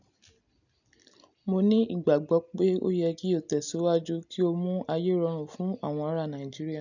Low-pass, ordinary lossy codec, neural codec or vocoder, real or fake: 7.2 kHz; none; none; real